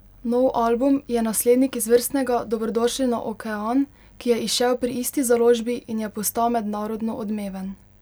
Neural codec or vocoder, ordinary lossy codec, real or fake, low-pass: none; none; real; none